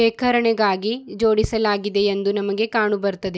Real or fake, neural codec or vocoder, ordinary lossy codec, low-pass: real; none; none; none